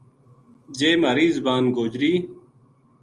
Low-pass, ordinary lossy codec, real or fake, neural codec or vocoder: 10.8 kHz; Opus, 32 kbps; real; none